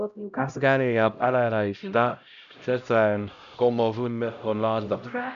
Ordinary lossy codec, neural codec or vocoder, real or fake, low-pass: none; codec, 16 kHz, 0.5 kbps, X-Codec, HuBERT features, trained on LibriSpeech; fake; 7.2 kHz